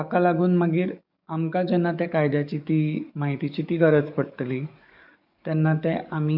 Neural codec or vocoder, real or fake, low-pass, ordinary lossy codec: codec, 44.1 kHz, 7.8 kbps, DAC; fake; 5.4 kHz; none